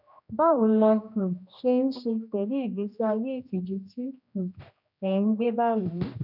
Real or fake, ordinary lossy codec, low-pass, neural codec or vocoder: fake; none; 5.4 kHz; codec, 16 kHz, 1 kbps, X-Codec, HuBERT features, trained on general audio